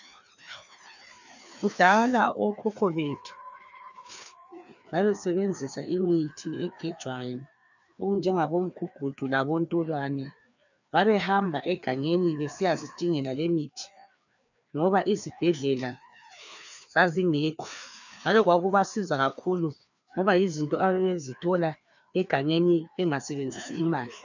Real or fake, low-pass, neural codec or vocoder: fake; 7.2 kHz; codec, 16 kHz, 2 kbps, FreqCodec, larger model